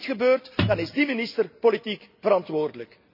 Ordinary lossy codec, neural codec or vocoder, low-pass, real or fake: none; none; 5.4 kHz; real